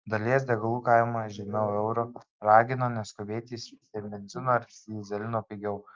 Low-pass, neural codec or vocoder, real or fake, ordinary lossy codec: 7.2 kHz; none; real; Opus, 24 kbps